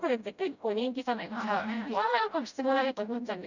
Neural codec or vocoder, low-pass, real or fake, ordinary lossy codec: codec, 16 kHz, 0.5 kbps, FreqCodec, smaller model; 7.2 kHz; fake; none